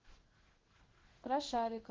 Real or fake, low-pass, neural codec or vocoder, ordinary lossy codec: fake; 7.2 kHz; codec, 16 kHz in and 24 kHz out, 1 kbps, XY-Tokenizer; Opus, 16 kbps